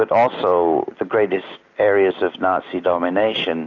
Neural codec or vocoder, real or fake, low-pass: none; real; 7.2 kHz